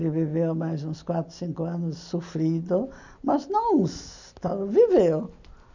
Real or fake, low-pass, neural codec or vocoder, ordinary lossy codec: real; 7.2 kHz; none; none